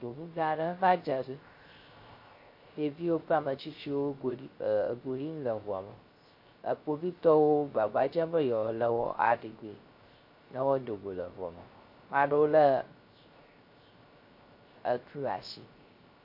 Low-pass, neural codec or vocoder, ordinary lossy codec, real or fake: 5.4 kHz; codec, 16 kHz, 0.3 kbps, FocalCodec; MP3, 32 kbps; fake